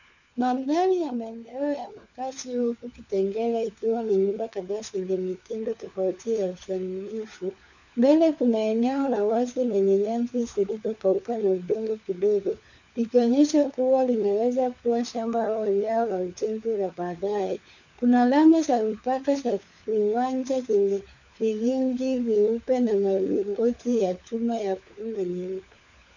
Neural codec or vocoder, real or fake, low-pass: codec, 16 kHz, 4 kbps, FunCodec, trained on LibriTTS, 50 frames a second; fake; 7.2 kHz